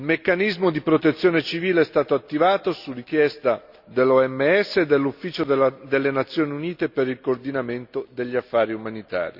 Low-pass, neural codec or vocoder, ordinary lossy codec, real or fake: 5.4 kHz; none; Opus, 64 kbps; real